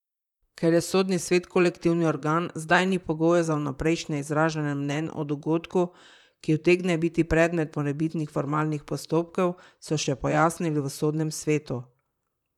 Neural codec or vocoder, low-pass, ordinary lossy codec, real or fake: vocoder, 44.1 kHz, 128 mel bands, Pupu-Vocoder; 19.8 kHz; none; fake